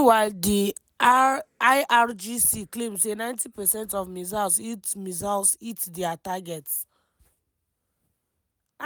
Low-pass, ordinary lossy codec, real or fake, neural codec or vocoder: none; none; real; none